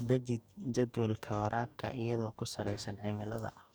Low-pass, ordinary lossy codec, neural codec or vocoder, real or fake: none; none; codec, 44.1 kHz, 2.6 kbps, DAC; fake